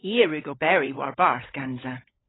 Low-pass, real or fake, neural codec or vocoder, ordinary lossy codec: 7.2 kHz; fake; codec, 16 kHz, 16 kbps, FunCodec, trained on LibriTTS, 50 frames a second; AAC, 16 kbps